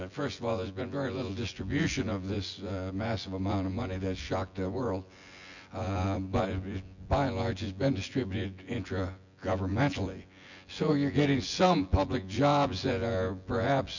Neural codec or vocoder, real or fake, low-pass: vocoder, 24 kHz, 100 mel bands, Vocos; fake; 7.2 kHz